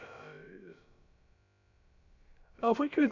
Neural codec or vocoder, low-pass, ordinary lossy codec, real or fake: codec, 16 kHz, about 1 kbps, DyCAST, with the encoder's durations; 7.2 kHz; none; fake